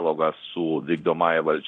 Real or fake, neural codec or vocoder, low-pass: fake; codec, 24 kHz, 0.9 kbps, DualCodec; 9.9 kHz